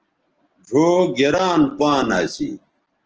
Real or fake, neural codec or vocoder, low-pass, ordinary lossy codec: real; none; 7.2 kHz; Opus, 16 kbps